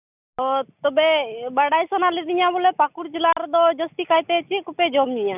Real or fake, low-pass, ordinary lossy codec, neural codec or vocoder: real; 3.6 kHz; none; none